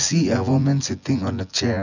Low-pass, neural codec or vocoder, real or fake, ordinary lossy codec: 7.2 kHz; vocoder, 24 kHz, 100 mel bands, Vocos; fake; none